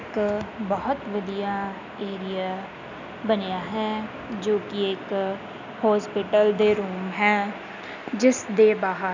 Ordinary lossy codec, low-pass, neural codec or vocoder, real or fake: none; 7.2 kHz; none; real